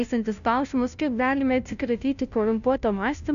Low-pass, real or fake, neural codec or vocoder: 7.2 kHz; fake; codec, 16 kHz, 0.5 kbps, FunCodec, trained on Chinese and English, 25 frames a second